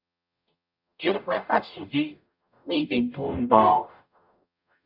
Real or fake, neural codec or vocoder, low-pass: fake; codec, 44.1 kHz, 0.9 kbps, DAC; 5.4 kHz